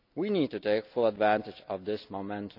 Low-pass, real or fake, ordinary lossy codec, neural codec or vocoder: 5.4 kHz; real; none; none